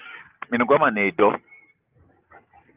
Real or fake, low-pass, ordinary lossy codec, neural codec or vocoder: real; 3.6 kHz; Opus, 24 kbps; none